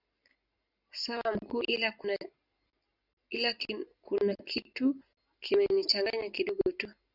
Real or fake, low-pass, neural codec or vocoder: real; 5.4 kHz; none